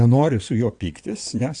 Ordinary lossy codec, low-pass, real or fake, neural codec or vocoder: AAC, 64 kbps; 9.9 kHz; fake; vocoder, 22.05 kHz, 80 mel bands, WaveNeXt